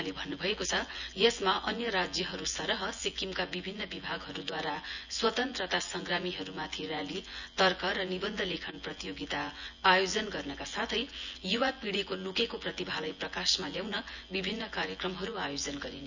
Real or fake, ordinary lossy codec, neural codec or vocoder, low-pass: fake; none; vocoder, 24 kHz, 100 mel bands, Vocos; 7.2 kHz